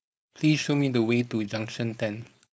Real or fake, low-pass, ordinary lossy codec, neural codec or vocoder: fake; none; none; codec, 16 kHz, 4.8 kbps, FACodec